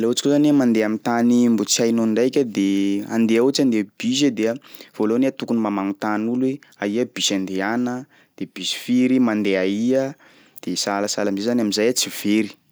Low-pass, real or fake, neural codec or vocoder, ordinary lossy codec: none; real; none; none